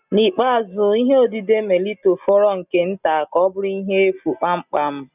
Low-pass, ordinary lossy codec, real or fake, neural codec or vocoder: 3.6 kHz; none; real; none